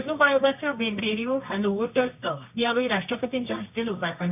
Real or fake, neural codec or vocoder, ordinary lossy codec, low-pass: fake; codec, 24 kHz, 0.9 kbps, WavTokenizer, medium music audio release; none; 3.6 kHz